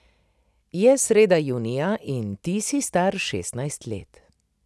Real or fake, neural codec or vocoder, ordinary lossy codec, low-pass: real; none; none; none